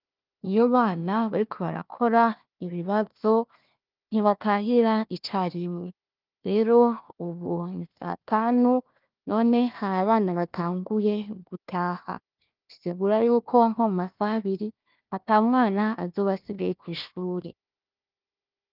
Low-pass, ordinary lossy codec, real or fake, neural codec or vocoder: 5.4 kHz; Opus, 32 kbps; fake; codec, 16 kHz, 1 kbps, FunCodec, trained on Chinese and English, 50 frames a second